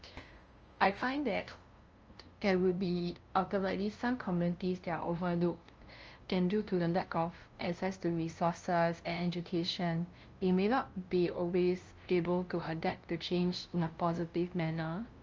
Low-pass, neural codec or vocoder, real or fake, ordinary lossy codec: 7.2 kHz; codec, 16 kHz, 0.5 kbps, FunCodec, trained on LibriTTS, 25 frames a second; fake; Opus, 24 kbps